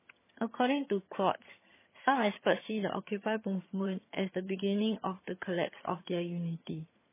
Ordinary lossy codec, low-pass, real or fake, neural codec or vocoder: MP3, 16 kbps; 3.6 kHz; fake; vocoder, 22.05 kHz, 80 mel bands, HiFi-GAN